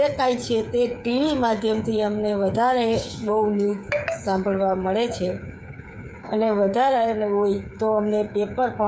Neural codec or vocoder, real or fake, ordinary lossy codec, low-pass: codec, 16 kHz, 8 kbps, FreqCodec, smaller model; fake; none; none